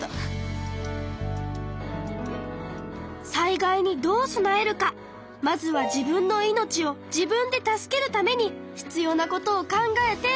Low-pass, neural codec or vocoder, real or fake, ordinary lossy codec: none; none; real; none